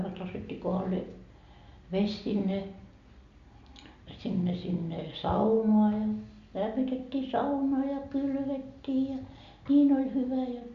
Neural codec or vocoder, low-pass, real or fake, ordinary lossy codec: none; 7.2 kHz; real; Opus, 64 kbps